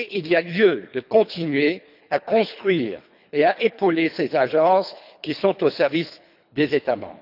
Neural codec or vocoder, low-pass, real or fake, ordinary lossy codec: codec, 24 kHz, 3 kbps, HILCodec; 5.4 kHz; fake; none